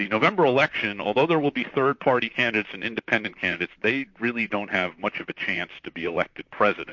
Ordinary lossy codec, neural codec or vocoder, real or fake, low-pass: MP3, 48 kbps; vocoder, 44.1 kHz, 128 mel bands, Pupu-Vocoder; fake; 7.2 kHz